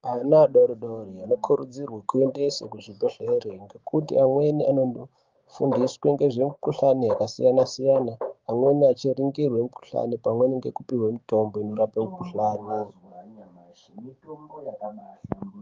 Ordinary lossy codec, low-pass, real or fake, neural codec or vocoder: Opus, 24 kbps; 7.2 kHz; fake; codec, 16 kHz, 6 kbps, DAC